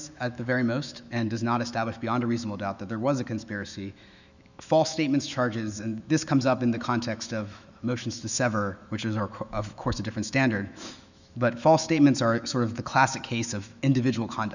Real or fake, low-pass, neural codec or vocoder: fake; 7.2 kHz; autoencoder, 48 kHz, 128 numbers a frame, DAC-VAE, trained on Japanese speech